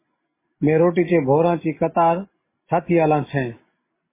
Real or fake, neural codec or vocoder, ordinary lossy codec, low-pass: real; none; MP3, 16 kbps; 3.6 kHz